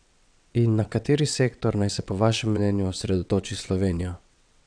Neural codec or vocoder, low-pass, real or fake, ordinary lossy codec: vocoder, 22.05 kHz, 80 mel bands, WaveNeXt; 9.9 kHz; fake; none